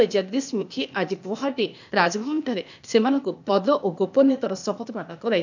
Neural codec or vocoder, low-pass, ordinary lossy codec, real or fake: codec, 16 kHz, 0.8 kbps, ZipCodec; 7.2 kHz; none; fake